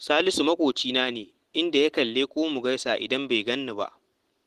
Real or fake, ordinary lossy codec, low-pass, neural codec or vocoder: real; Opus, 16 kbps; 14.4 kHz; none